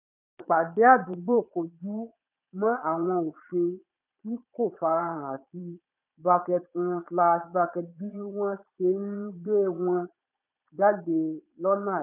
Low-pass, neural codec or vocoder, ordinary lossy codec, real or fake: 3.6 kHz; vocoder, 22.05 kHz, 80 mel bands, Vocos; none; fake